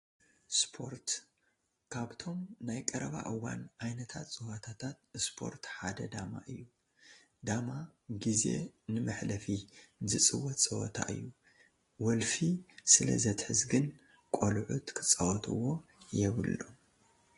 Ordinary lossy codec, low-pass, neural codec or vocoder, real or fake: AAC, 32 kbps; 10.8 kHz; none; real